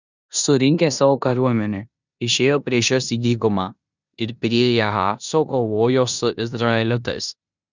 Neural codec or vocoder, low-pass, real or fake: codec, 16 kHz in and 24 kHz out, 0.9 kbps, LongCat-Audio-Codec, four codebook decoder; 7.2 kHz; fake